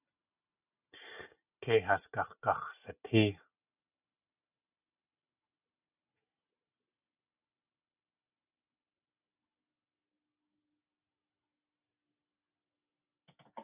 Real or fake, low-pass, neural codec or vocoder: real; 3.6 kHz; none